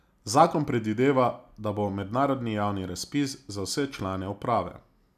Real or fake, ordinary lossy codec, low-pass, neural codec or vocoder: real; none; 14.4 kHz; none